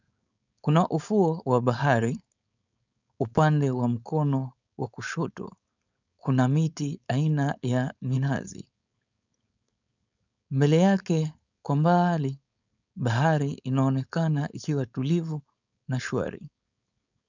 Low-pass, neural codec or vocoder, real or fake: 7.2 kHz; codec, 16 kHz, 4.8 kbps, FACodec; fake